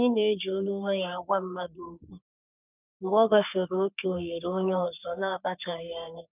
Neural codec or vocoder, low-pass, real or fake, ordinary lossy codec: codec, 44.1 kHz, 3.4 kbps, Pupu-Codec; 3.6 kHz; fake; none